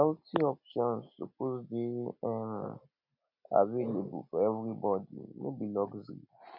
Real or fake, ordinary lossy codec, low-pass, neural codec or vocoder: real; none; 5.4 kHz; none